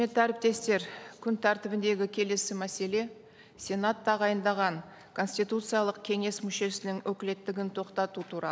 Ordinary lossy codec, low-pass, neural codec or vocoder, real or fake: none; none; none; real